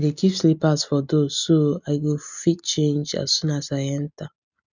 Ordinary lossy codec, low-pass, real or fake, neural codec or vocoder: none; 7.2 kHz; real; none